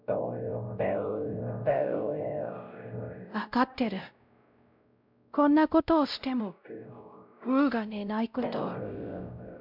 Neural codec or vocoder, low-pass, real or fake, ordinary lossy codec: codec, 16 kHz, 0.5 kbps, X-Codec, WavLM features, trained on Multilingual LibriSpeech; 5.4 kHz; fake; none